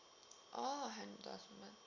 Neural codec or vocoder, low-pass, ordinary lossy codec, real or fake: none; 7.2 kHz; Opus, 64 kbps; real